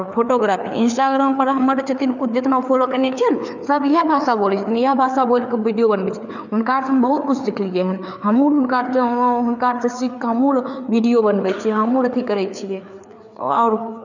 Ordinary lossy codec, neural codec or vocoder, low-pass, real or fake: none; codec, 16 kHz, 4 kbps, FreqCodec, larger model; 7.2 kHz; fake